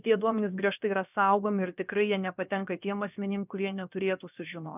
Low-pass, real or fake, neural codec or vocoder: 3.6 kHz; fake; codec, 16 kHz, about 1 kbps, DyCAST, with the encoder's durations